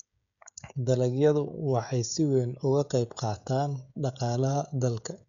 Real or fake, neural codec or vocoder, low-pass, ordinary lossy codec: fake; codec, 16 kHz, 16 kbps, FreqCodec, smaller model; 7.2 kHz; none